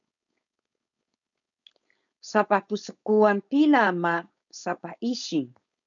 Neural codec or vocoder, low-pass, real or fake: codec, 16 kHz, 4.8 kbps, FACodec; 7.2 kHz; fake